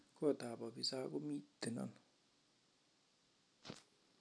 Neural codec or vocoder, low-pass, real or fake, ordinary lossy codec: none; none; real; none